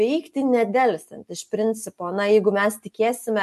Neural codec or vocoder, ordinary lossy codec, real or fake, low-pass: none; MP3, 64 kbps; real; 14.4 kHz